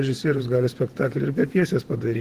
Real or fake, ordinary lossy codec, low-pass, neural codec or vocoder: fake; Opus, 16 kbps; 14.4 kHz; vocoder, 48 kHz, 128 mel bands, Vocos